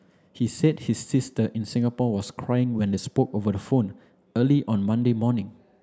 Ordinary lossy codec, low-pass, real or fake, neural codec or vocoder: none; none; real; none